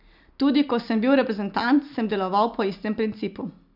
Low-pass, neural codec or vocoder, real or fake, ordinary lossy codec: 5.4 kHz; none; real; none